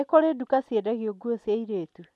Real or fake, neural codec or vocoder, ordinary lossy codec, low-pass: real; none; none; none